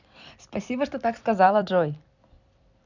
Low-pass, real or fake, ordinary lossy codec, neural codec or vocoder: 7.2 kHz; fake; none; vocoder, 44.1 kHz, 128 mel bands every 256 samples, BigVGAN v2